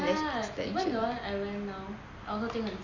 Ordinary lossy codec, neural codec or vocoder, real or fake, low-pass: Opus, 64 kbps; none; real; 7.2 kHz